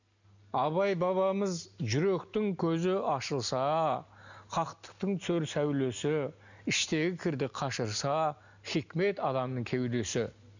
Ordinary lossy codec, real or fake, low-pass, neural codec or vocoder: none; real; 7.2 kHz; none